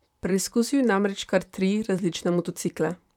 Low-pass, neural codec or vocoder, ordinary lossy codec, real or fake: 19.8 kHz; vocoder, 44.1 kHz, 128 mel bands, Pupu-Vocoder; none; fake